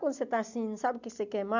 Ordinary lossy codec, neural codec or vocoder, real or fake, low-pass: none; none; real; 7.2 kHz